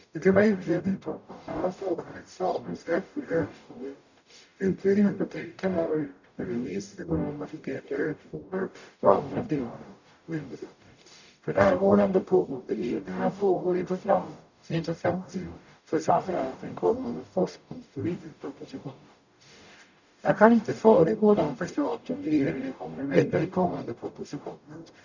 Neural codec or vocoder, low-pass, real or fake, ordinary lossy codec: codec, 44.1 kHz, 0.9 kbps, DAC; 7.2 kHz; fake; none